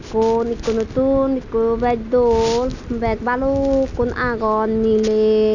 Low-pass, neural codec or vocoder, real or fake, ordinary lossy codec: 7.2 kHz; none; real; none